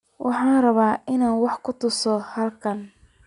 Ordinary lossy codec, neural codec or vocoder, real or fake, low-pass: none; none; real; 10.8 kHz